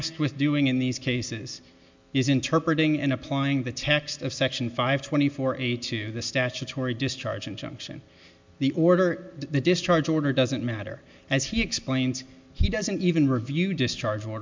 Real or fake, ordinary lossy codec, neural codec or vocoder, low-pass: real; MP3, 64 kbps; none; 7.2 kHz